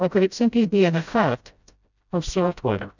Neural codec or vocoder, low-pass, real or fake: codec, 16 kHz, 0.5 kbps, FreqCodec, smaller model; 7.2 kHz; fake